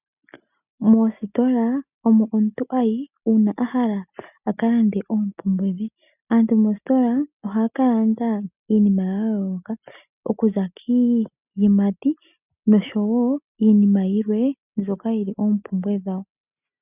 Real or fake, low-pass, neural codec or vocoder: real; 3.6 kHz; none